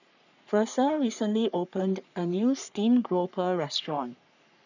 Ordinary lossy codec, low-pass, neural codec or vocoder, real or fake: none; 7.2 kHz; codec, 44.1 kHz, 3.4 kbps, Pupu-Codec; fake